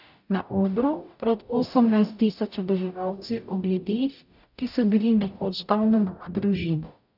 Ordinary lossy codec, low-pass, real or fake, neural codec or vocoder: none; 5.4 kHz; fake; codec, 44.1 kHz, 0.9 kbps, DAC